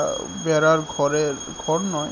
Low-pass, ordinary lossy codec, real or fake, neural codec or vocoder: 7.2 kHz; none; real; none